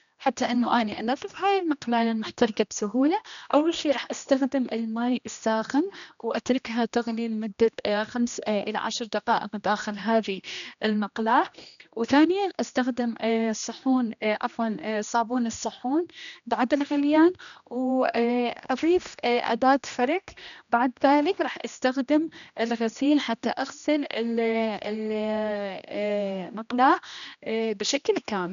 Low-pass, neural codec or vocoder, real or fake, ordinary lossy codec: 7.2 kHz; codec, 16 kHz, 1 kbps, X-Codec, HuBERT features, trained on general audio; fake; none